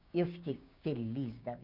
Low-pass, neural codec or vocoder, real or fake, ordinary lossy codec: 5.4 kHz; codec, 16 kHz, 6 kbps, DAC; fake; none